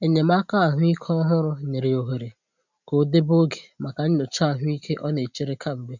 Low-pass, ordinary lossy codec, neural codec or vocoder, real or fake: 7.2 kHz; none; none; real